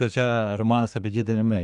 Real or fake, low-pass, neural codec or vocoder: fake; 10.8 kHz; codec, 24 kHz, 1 kbps, SNAC